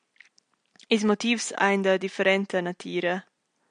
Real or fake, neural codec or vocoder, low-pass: real; none; 9.9 kHz